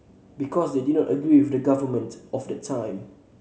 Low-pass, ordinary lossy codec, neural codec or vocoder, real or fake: none; none; none; real